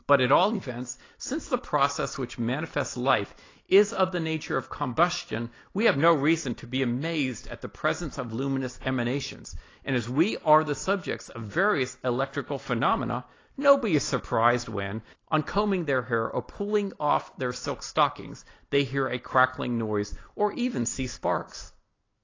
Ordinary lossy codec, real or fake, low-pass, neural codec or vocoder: AAC, 32 kbps; real; 7.2 kHz; none